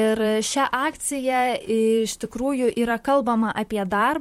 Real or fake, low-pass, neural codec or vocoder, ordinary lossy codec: fake; 19.8 kHz; vocoder, 44.1 kHz, 128 mel bands, Pupu-Vocoder; MP3, 64 kbps